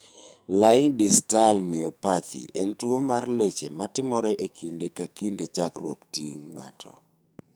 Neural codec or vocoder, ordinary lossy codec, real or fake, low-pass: codec, 44.1 kHz, 2.6 kbps, SNAC; none; fake; none